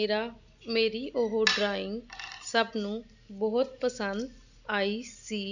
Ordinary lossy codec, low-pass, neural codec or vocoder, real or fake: none; 7.2 kHz; none; real